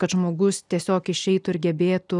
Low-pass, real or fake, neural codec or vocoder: 10.8 kHz; real; none